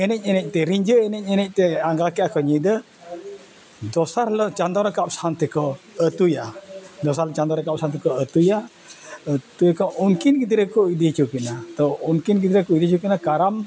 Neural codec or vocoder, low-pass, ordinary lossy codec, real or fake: none; none; none; real